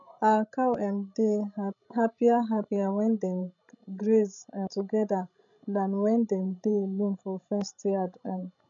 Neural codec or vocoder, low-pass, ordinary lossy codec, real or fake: codec, 16 kHz, 16 kbps, FreqCodec, larger model; 7.2 kHz; none; fake